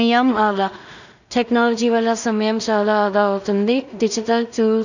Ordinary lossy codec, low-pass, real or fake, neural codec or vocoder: none; 7.2 kHz; fake; codec, 16 kHz in and 24 kHz out, 0.4 kbps, LongCat-Audio-Codec, two codebook decoder